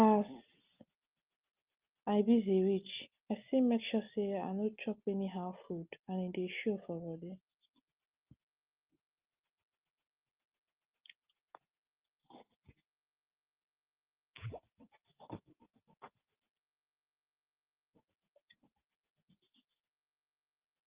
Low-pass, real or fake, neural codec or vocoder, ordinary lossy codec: 3.6 kHz; real; none; Opus, 24 kbps